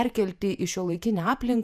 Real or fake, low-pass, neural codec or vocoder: fake; 14.4 kHz; vocoder, 48 kHz, 128 mel bands, Vocos